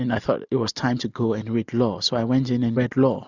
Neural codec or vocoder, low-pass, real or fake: none; 7.2 kHz; real